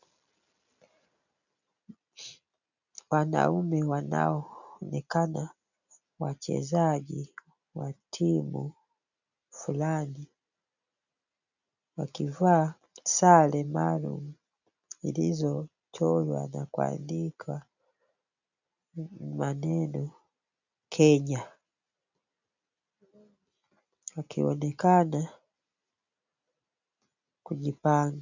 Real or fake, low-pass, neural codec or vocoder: real; 7.2 kHz; none